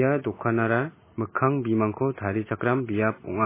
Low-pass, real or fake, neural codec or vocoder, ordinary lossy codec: 3.6 kHz; real; none; MP3, 16 kbps